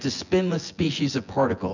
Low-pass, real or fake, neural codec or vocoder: 7.2 kHz; fake; vocoder, 24 kHz, 100 mel bands, Vocos